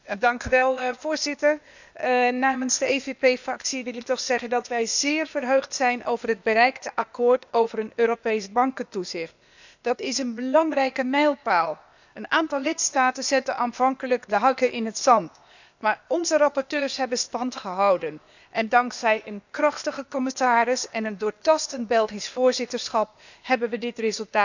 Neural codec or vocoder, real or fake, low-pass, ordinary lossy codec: codec, 16 kHz, 0.8 kbps, ZipCodec; fake; 7.2 kHz; none